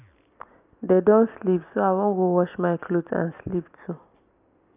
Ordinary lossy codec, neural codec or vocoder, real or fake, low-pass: none; none; real; 3.6 kHz